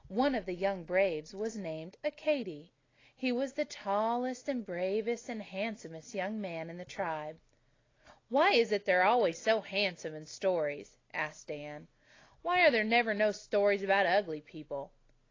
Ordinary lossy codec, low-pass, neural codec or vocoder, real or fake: AAC, 32 kbps; 7.2 kHz; none; real